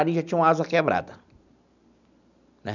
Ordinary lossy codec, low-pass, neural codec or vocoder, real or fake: none; 7.2 kHz; none; real